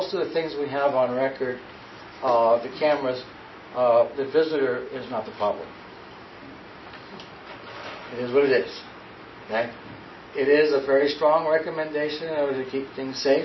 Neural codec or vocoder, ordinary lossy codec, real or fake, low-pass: none; MP3, 24 kbps; real; 7.2 kHz